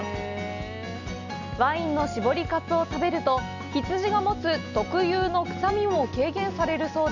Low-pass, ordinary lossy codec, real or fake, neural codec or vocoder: 7.2 kHz; none; real; none